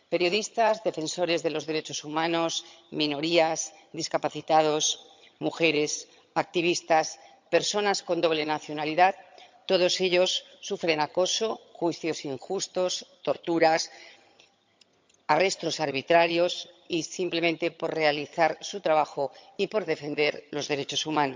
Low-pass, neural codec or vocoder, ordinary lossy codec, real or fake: 7.2 kHz; vocoder, 22.05 kHz, 80 mel bands, HiFi-GAN; MP3, 64 kbps; fake